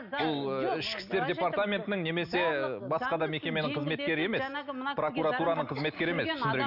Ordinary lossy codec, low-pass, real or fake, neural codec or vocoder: none; 5.4 kHz; real; none